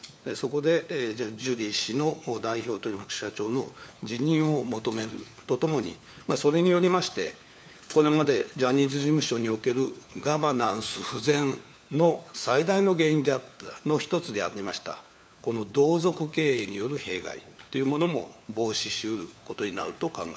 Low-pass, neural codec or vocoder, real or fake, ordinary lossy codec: none; codec, 16 kHz, 4 kbps, FunCodec, trained on LibriTTS, 50 frames a second; fake; none